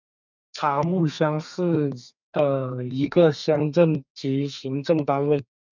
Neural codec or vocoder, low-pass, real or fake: codec, 32 kHz, 1.9 kbps, SNAC; 7.2 kHz; fake